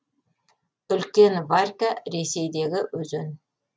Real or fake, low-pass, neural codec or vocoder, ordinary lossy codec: real; none; none; none